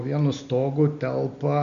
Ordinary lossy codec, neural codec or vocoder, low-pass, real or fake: MP3, 48 kbps; none; 7.2 kHz; real